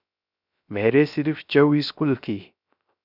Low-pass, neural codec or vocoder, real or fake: 5.4 kHz; codec, 16 kHz, 0.3 kbps, FocalCodec; fake